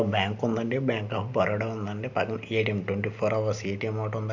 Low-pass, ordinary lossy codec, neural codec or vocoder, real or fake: 7.2 kHz; none; none; real